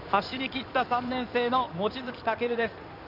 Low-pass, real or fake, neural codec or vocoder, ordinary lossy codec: 5.4 kHz; fake; codec, 16 kHz in and 24 kHz out, 2.2 kbps, FireRedTTS-2 codec; none